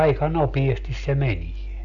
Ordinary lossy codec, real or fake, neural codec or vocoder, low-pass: none; real; none; 7.2 kHz